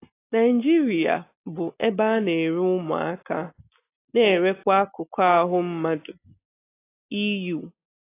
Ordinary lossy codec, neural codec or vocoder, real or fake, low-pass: AAC, 24 kbps; none; real; 3.6 kHz